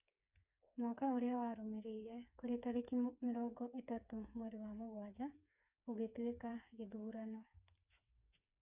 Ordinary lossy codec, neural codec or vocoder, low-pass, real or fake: none; codec, 16 kHz, 4 kbps, FreqCodec, smaller model; 3.6 kHz; fake